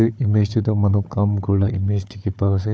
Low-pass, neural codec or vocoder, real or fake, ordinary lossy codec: none; codec, 16 kHz, 4 kbps, FunCodec, trained on Chinese and English, 50 frames a second; fake; none